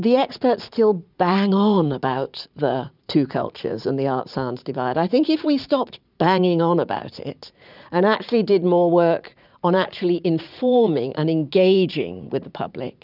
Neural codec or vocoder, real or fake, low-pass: vocoder, 44.1 kHz, 80 mel bands, Vocos; fake; 5.4 kHz